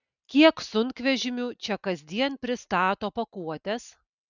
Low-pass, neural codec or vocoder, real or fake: 7.2 kHz; none; real